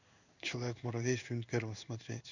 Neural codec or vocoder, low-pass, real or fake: codec, 16 kHz in and 24 kHz out, 1 kbps, XY-Tokenizer; 7.2 kHz; fake